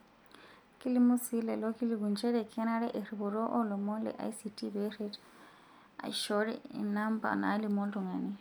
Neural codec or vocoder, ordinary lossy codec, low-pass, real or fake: none; none; none; real